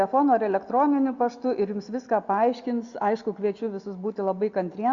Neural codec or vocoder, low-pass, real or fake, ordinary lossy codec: none; 7.2 kHz; real; Opus, 64 kbps